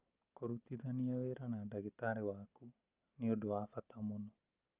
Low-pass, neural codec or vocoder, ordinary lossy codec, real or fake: 3.6 kHz; none; Opus, 32 kbps; real